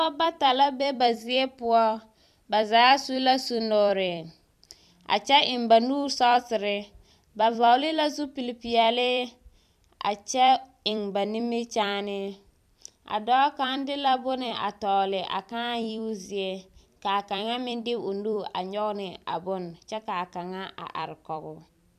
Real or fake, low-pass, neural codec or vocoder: fake; 14.4 kHz; vocoder, 44.1 kHz, 128 mel bands every 256 samples, BigVGAN v2